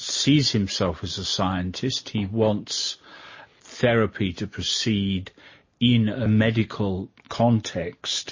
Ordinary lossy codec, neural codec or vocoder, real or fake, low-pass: MP3, 32 kbps; none; real; 7.2 kHz